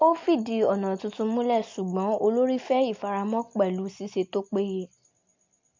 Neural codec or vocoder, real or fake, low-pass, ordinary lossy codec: none; real; 7.2 kHz; MP3, 48 kbps